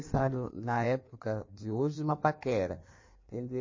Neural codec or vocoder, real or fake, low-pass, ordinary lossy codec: codec, 16 kHz in and 24 kHz out, 1.1 kbps, FireRedTTS-2 codec; fake; 7.2 kHz; MP3, 32 kbps